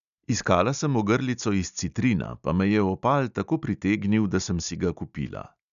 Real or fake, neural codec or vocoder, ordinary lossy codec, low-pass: real; none; none; 7.2 kHz